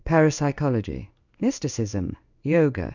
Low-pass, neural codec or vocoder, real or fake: 7.2 kHz; codec, 16 kHz in and 24 kHz out, 1 kbps, XY-Tokenizer; fake